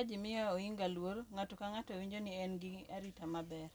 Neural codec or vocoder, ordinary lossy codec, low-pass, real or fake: none; none; none; real